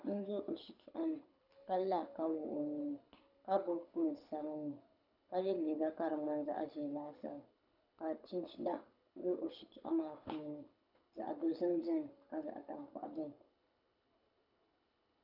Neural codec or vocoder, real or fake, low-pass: codec, 24 kHz, 6 kbps, HILCodec; fake; 5.4 kHz